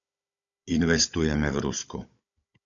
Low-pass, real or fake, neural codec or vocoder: 7.2 kHz; fake; codec, 16 kHz, 16 kbps, FunCodec, trained on Chinese and English, 50 frames a second